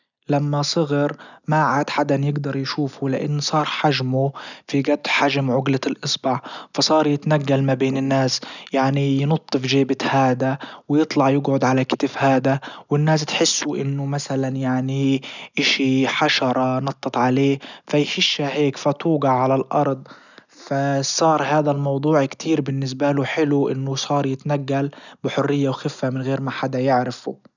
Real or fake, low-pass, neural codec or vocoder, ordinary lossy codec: real; 7.2 kHz; none; none